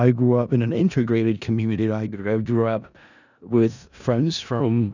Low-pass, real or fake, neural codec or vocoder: 7.2 kHz; fake; codec, 16 kHz in and 24 kHz out, 0.4 kbps, LongCat-Audio-Codec, four codebook decoder